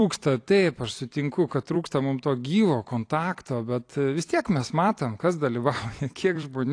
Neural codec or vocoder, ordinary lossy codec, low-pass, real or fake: vocoder, 44.1 kHz, 128 mel bands every 256 samples, BigVGAN v2; AAC, 48 kbps; 9.9 kHz; fake